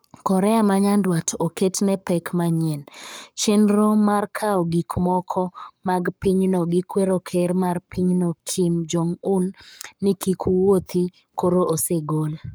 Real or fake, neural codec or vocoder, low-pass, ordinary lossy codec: fake; codec, 44.1 kHz, 7.8 kbps, Pupu-Codec; none; none